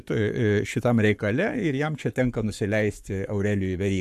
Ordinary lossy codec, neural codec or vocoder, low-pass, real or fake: AAC, 96 kbps; codec, 44.1 kHz, 7.8 kbps, Pupu-Codec; 14.4 kHz; fake